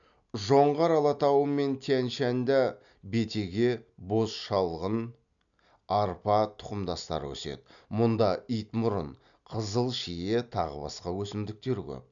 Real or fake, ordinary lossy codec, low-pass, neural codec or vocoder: real; none; 7.2 kHz; none